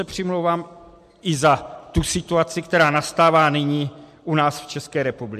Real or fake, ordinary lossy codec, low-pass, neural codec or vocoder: real; MP3, 64 kbps; 14.4 kHz; none